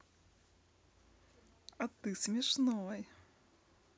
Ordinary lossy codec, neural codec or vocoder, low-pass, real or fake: none; none; none; real